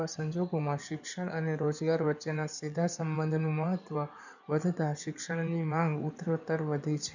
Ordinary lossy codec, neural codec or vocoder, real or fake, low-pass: none; codec, 16 kHz in and 24 kHz out, 2.2 kbps, FireRedTTS-2 codec; fake; 7.2 kHz